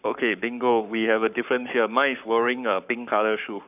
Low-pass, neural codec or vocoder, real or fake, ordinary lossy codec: 3.6 kHz; codec, 44.1 kHz, 7.8 kbps, Pupu-Codec; fake; none